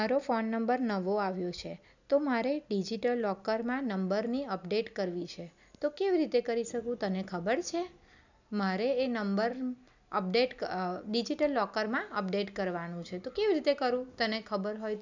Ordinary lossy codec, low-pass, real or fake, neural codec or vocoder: none; 7.2 kHz; real; none